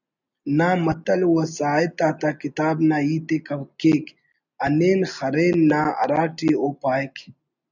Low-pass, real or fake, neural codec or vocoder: 7.2 kHz; real; none